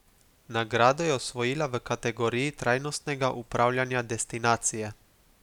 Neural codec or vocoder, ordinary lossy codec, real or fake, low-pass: none; none; real; 19.8 kHz